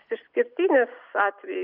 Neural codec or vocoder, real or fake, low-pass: none; real; 5.4 kHz